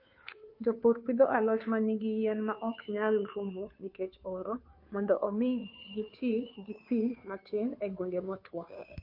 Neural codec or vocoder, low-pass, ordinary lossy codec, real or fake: codec, 16 kHz, 2 kbps, FunCodec, trained on Chinese and English, 25 frames a second; 5.4 kHz; MP3, 32 kbps; fake